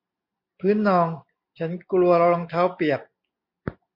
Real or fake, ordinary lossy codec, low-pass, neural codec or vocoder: real; MP3, 48 kbps; 5.4 kHz; none